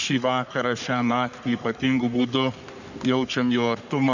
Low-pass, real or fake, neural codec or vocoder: 7.2 kHz; fake; codec, 44.1 kHz, 3.4 kbps, Pupu-Codec